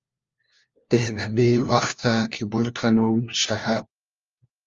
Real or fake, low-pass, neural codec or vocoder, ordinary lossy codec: fake; 7.2 kHz; codec, 16 kHz, 1 kbps, FunCodec, trained on LibriTTS, 50 frames a second; Opus, 32 kbps